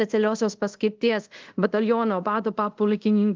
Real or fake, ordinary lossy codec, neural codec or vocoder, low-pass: fake; Opus, 32 kbps; codec, 24 kHz, 0.5 kbps, DualCodec; 7.2 kHz